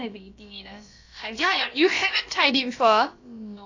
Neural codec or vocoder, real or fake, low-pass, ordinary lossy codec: codec, 16 kHz, about 1 kbps, DyCAST, with the encoder's durations; fake; 7.2 kHz; AAC, 48 kbps